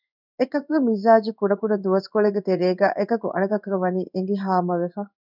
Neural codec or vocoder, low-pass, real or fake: codec, 16 kHz in and 24 kHz out, 1 kbps, XY-Tokenizer; 5.4 kHz; fake